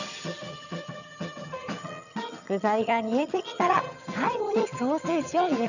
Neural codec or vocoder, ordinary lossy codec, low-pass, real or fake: vocoder, 22.05 kHz, 80 mel bands, HiFi-GAN; none; 7.2 kHz; fake